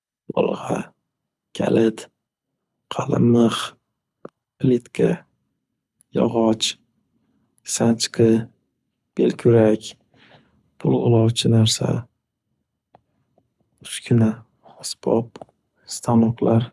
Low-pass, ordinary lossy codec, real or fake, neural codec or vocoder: none; none; fake; codec, 24 kHz, 6 kbps, HILCodec